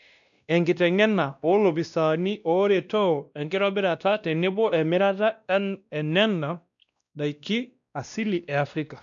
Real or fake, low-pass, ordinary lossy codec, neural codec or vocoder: fake; 7.2 kHz; none; codec, 16 kHz, 1 kbps, X-Codec, WavLM features, trained on Multilingual LibriSpeech